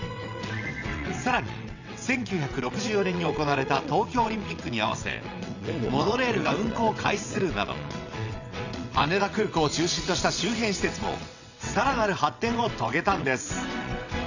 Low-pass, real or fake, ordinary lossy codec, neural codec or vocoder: 7.2 kHz; fake; none; vocoder, 22.05 kHz, 80 mel bands, WaveNeXt